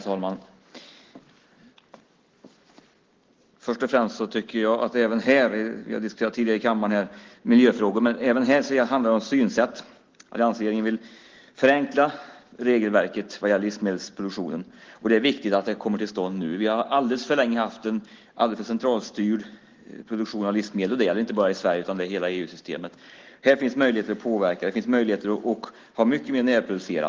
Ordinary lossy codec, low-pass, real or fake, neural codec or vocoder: Opus, 16 kbps; 7.2 kHz; real; none